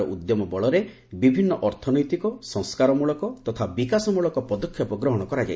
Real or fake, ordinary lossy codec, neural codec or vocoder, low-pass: real; none; none; none